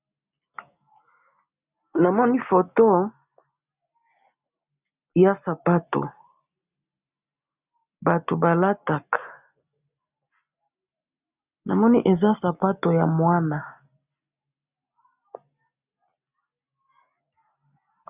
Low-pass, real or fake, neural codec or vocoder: 3.6 kHz; real; none